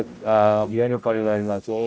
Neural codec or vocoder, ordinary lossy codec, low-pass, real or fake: codec, 16 kHz, 0.5 kbps, X-Codec, HuBERT features, trained on general audio; none; none; fake